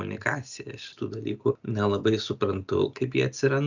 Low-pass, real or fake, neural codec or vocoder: 7.2 kHz; real; none